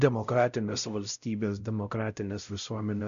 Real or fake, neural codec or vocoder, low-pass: fake; codec, 16 kHz, 0.5 kbps, X-Codec, WavLM features, trained on Multilingual LibriSpeech; 7.2 kHz